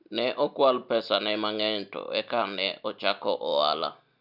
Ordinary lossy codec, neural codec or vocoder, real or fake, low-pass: none; none; real; 5.4 kHz